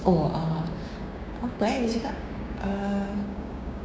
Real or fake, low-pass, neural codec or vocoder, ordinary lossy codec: fake; none; codec, 16 kHz, 6 kbps, DAC; none